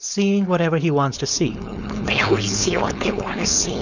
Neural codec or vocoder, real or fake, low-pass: codec, 16 kHz, 4.8 kbps, FACodec; fake; 7.2 kHz